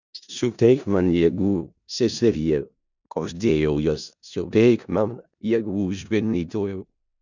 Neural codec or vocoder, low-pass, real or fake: codec, 16 kHz in and 24 kHz out, 0.4 kbps, LongCat-Audio-Codec, four codebook decoder; 7.2 kHz; fake